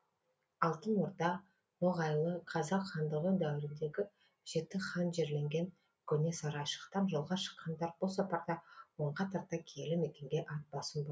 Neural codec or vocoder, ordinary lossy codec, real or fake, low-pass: none; none; real; none